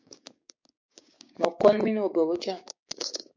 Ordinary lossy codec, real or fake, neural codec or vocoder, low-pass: MP3, 48 kbps; fake; codec, 16 kHz, 6 kbps, DAC; 7.2 kHz